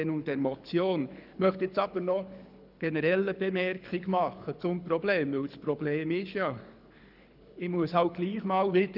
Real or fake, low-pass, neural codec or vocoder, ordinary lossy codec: fake; 5.4 kHz; codec, 24 kHz, 6 kbps, HILCodec; none